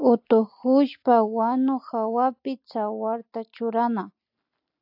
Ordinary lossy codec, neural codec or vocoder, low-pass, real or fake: AAC, 48 kbps; none; 5.4 kHz; real